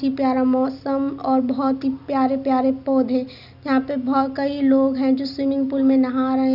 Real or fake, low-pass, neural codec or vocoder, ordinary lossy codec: real; 5.4 kHz; none; none